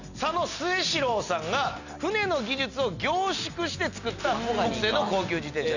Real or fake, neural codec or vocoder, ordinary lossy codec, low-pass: real; none; none; 7.2 kHz